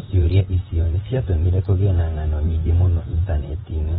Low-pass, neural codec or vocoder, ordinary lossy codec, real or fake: 19.8 kHz; vocoder, 44.1 kHz, 128 mel bands, Pupu-Vocoder; AAC, 16 kbps; fake